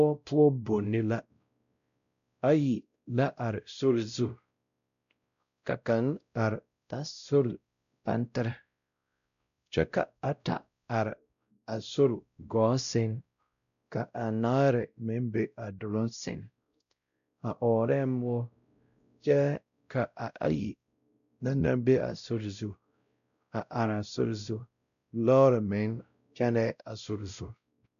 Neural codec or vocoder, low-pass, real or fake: codec, 16 kHz, 0.5 kbps, X-Codec, WavLM features, trained on Multilingual LibriSpeech; 7.2 kHz; fake